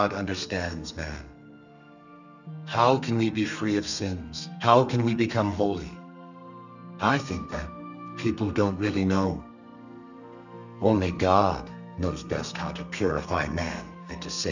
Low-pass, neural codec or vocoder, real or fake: 7.2 kHz; codec, 32 kHz, 1.9 kbps, SNAC; fake